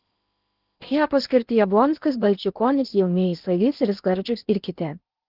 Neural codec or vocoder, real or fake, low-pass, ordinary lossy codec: codec, 16 kHz in and 24 kHz out, 0.8 kbps, FocalCodec, streaming, 65536 codes; fake; 5.4 kHz; Opus, 32 kbps